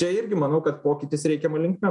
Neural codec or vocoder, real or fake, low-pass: none; real; 10.8 kHz